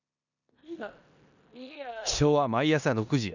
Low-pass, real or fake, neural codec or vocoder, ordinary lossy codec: 7.2 kHz; fake; codec, 16 kHz in and 24 kHz out, 0.9 kbps, LongCat-Audio-Codec, four codebook decoder; none